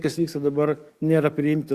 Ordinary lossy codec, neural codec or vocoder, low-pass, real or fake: Opus, 64 kbps; codec, 44.1 kHz, 7.8 kbps, DAC; 14.4 kHz; fake